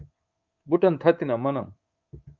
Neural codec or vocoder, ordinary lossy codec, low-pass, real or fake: codec, 24 kHz, 3.1 kbps, DualCodec; Opus, 32 kbps; 7.2 kHz; fake